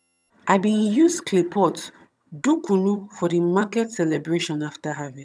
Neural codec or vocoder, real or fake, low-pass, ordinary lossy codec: vocoder, 22.05 kHz, 80 mel bands, HiFi-GAN; fake; none; none